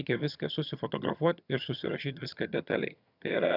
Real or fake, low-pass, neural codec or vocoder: fake; 5.4 kHz; vocoder, 22.05 kHz, 80 mel bands, HiFi-GAN